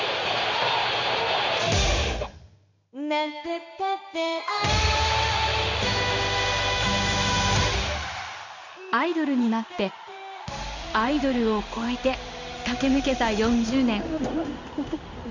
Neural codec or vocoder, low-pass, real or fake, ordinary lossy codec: codec, 16 kHz in and 24 kHz out, 1 kbps, XY-Tokenizer; 7.2 kHz; fake; none